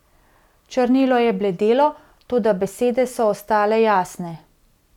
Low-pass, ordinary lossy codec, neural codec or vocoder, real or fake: 19.8 kHz; none; none; real